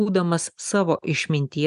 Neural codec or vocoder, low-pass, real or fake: none; 10.8 kHz; real